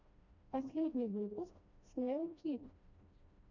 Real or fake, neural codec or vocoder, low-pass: fake; codec, 16 kHz, 1 kbps, FreqCodec, smaller model; 7.2 kHz